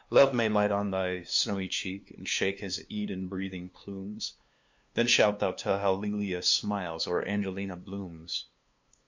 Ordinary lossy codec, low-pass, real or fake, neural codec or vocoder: MP3, 48 kbps; 7.2 kHz; fake; codec, 16 kHz, 2 kbps, FunCodec, trained on LibriTTS, 25 frames a second